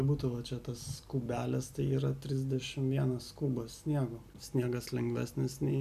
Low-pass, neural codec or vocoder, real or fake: 14.4 kHz; none; real